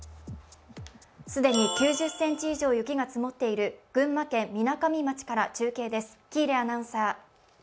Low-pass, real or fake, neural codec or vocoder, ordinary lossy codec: none; real; none; none